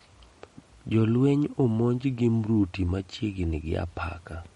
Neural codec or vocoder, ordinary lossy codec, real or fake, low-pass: none; MP3, 48 kbps; real; 19.8 kHz